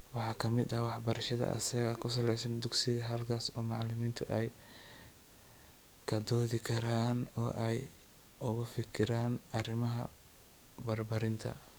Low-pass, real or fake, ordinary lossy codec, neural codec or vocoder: none; fake; none; codec, 44.1 kHz, 7.8 kbps, DAC